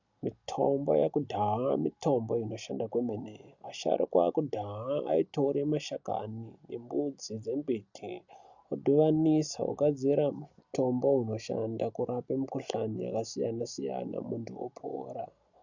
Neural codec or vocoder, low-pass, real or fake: none; 7.2 kHz; real